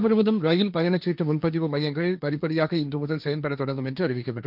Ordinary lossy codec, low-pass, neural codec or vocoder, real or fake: none; 5.4 kHz; codec, 16 kHz, 1.1 kbps, Voila-Tokenizer; fake